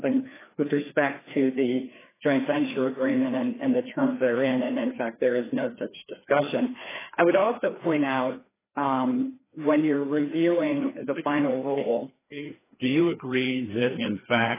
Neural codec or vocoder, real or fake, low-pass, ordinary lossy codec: codec, 16 kHz, 2 kbps, FreqCodec, larger model; fake; 3.6 kHz; AAC, 16 kbps